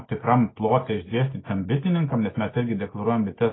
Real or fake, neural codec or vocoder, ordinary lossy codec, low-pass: real; none; AAC, 16 kbps; 7.2 kHz